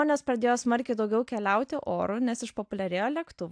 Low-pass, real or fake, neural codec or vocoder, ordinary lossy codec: 9.9 kHz; real; none; AAC, 64 kbps